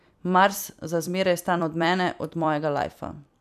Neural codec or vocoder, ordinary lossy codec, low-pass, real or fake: vocoder, 44.1 kHz, 128 mel bands every 256 samples, BigVGAN v2; none; 14.4 kHz; fake